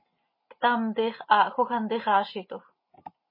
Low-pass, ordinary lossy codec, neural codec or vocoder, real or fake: 5.4 kHz; MP3, 24 kbps; none; real